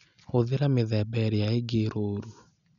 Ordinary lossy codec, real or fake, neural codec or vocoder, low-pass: MP3, 96 kbps; real; none; 7.2 kHz